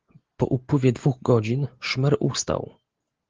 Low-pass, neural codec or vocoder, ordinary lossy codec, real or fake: 7.2 kHz; none; Opus, 16 kbps; real